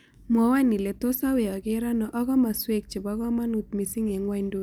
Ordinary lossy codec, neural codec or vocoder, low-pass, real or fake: none; none; none; real